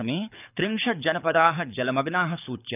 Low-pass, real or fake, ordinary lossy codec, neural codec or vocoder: 3.6 kHz; fake; none; codec, 24 kHz, 6 kbps, HILCodec